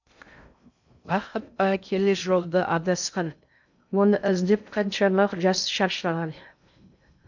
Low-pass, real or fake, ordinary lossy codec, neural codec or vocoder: 7.2 kHz; fake; none; codec, 16 kHz in and 24 kHz out, 0.6 kbps, FocalCodec, streaming, 2048 codes